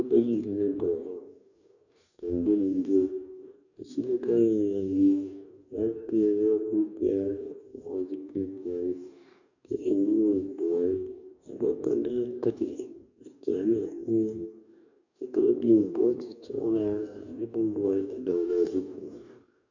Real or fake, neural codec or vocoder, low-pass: fake; codec, 44.1 kHz, 2.6 kbps, DAC; 7.2 kHz